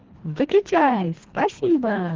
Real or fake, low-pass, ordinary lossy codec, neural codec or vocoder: fake; 7.2 kHz; Opus, 16 kbps; codec, 24 kHz, 1.5 kbps, HILCodec